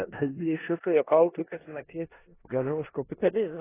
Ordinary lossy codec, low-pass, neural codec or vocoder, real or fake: AAC, 16 kbps; 3.6 kHz; codec, 16 kHz in and 24 kHz out, 0.4 kbps, LongCat-Audio-Codec, four codebook decoder; fake